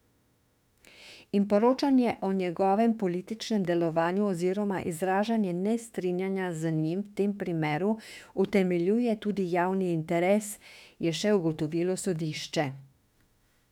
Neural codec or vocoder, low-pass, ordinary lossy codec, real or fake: autoencoder, 48 kHz, 32 numbers a frame, DAC-VAE, trained on Japanese speech; 19.8 kHz; none; fake